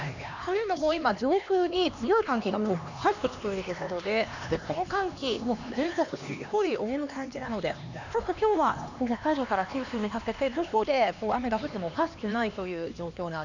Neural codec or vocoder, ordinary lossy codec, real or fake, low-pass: codec, 16 kHz, 2 kbps, X-Codec, HuBERT features, trained on LibriSpeech; none; fake; 7.2 kHz